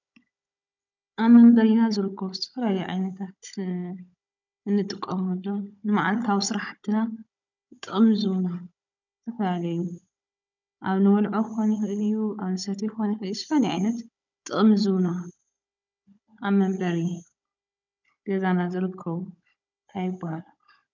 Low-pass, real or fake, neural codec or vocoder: 7.2 kHz; fake; codec, 16 kHz, 16 kbps, FunCodec, trained on Chinese and English, 50 frames a second